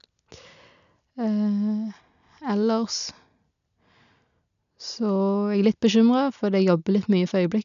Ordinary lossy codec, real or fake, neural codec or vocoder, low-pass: none; real; none; 7.2 kHz